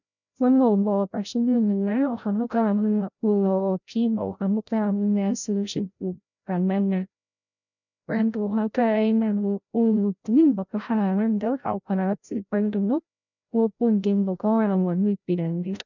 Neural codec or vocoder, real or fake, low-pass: codec, 16 kHz, 0.5 kbps, FreqCodec, larger model; fake; 7.2 kHz